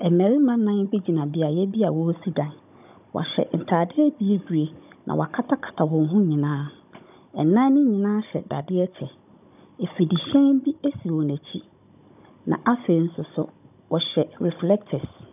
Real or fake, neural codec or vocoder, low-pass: fake; codec, 16 kHz, 16 kbps, FunCodec, trained on Chinese and English, 50 frames a second; 3.6 kHz